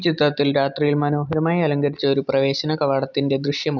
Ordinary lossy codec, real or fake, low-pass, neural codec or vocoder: none; real; 7.2 kHz; none